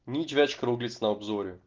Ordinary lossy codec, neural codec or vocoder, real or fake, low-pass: Opus, 16 kbps; none; real; 7.2 kHz